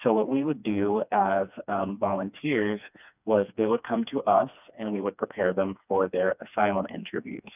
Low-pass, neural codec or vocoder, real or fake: 3.6 kHz; codec, 16 kHz, 2 kbps, FreqCodec, smaller model; fake